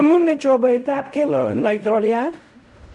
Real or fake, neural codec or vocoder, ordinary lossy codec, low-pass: fake; codec, 16 kHz in and 24 kHz out, 0.4 kbps, LongCat-Audio-Codec, fine tuned four codebook decoder; none; 10.8 kHz